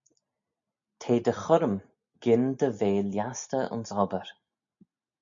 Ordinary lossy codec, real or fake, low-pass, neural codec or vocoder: MP3, 64 kbps; real; 7.2 kHz; none